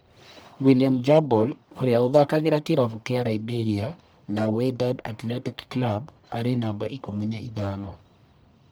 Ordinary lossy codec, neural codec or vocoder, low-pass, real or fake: none; codec, 44.1 kHz, 1.7 kbps, Pupu-Codec; none; fake